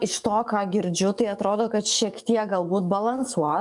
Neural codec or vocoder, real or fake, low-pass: codec, 44.1 kHz, 7.8 kbps, DAC; fake; 10.8 kHz